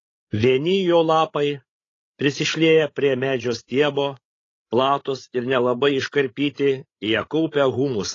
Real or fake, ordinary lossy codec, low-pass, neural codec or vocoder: fake; AAC, 32 kbps; 7.2 kHz; codec, 16 kHz, 16 kbps, FreqCodec, larger model